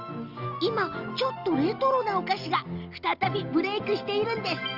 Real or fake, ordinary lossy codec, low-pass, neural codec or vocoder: real; Opus, 32 kbps; 5.4 kHz; none